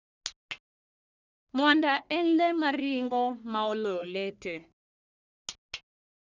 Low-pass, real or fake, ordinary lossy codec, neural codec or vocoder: 7.2 kHz; fake; none; codec, 44.1 kHz, 1.7 kbps, Pupu-Codec